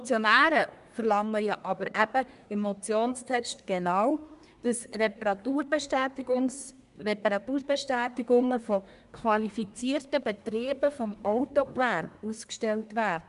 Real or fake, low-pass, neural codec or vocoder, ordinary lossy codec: fake; 10.8 kHz; codec, 24 kHz, 1 kbps, SNAC; none